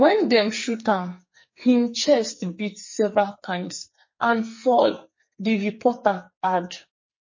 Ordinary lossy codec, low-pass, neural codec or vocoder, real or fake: MP3, 32 kbps; 7.2 kHz; codec, 44.1 kHz, 2.6 kbps, SNAC; fake